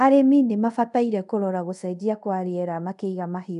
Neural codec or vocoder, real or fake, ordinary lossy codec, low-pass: codec, 24 kHz, 0.5 kbps, DualCodec; fake; none; 10.8 kHz